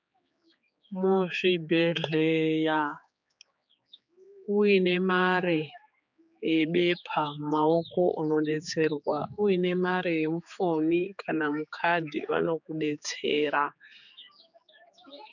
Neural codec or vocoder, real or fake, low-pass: codec, 16 kHz, 4 kbps, X-Codec, HuBERT features, trained on general audio; fake; 7.2 kHz